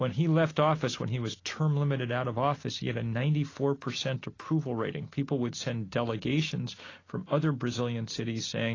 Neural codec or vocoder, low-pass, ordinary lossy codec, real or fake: none; 7.2 kHz; AAC, 32 kbps; real